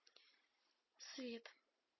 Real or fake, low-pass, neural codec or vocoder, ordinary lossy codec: real; 7.2 kHz; none; MP3, 24 kbps